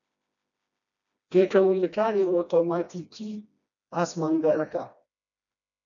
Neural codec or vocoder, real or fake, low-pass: codec, 16 kHz, 1 kbps, FreqCodec, smaller model; fake; 7.2 kHz